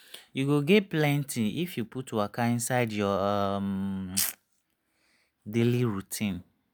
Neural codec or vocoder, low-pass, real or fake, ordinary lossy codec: none; none; real; none